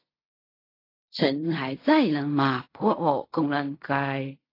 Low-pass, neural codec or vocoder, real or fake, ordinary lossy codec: 5.4 kHz; codec, 16 kHz in and 24 kHz out, 0.4 kbps, LongCat-Audio-Codec, fine tuned four codebook decoder; fake; AAC, 32 kbps